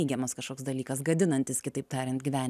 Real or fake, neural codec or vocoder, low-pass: real; none; 14.4 kHz